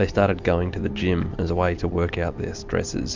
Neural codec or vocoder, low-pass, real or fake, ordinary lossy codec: none; 7.2 kHz; real; MP3, 64 kbps